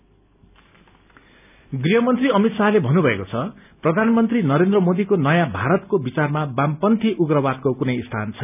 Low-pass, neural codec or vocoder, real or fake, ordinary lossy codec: 3.6 kHz; none; real; none